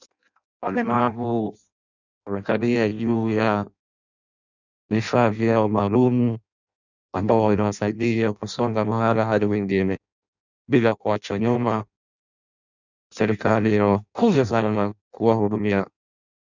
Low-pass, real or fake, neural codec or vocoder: 7.2 kHz; fake; codec, 16 kHz in and 24 kHz out, 0.6 kbps, FireRedTTS-2 codec